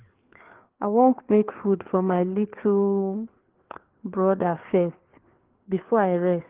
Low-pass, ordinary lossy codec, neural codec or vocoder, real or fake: 3.6 kHz; Opus, 16 kbps; codec, 16 kHz, 4 kbps, FreqCodec, larger model; fake